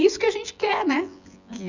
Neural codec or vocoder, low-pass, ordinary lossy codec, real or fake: vocoder, 24 kHz, 100 mel bands, Vocos; 7.2 kHz; none; fake